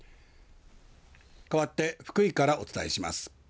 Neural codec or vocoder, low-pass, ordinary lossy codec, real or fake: none; none; none; real